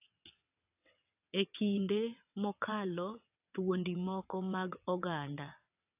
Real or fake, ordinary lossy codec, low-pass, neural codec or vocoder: fake; AAC, 32 kbps; 3.6 kHz; vocoder, 22.05 kHz, 80 mel bands, WaveNeXt